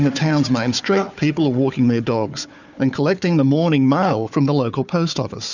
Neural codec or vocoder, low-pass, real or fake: codec, 16 kHz, 4 kbps, FunCodec, trained on Chinese and English, 50 frames a second; 7.2 kHz; fake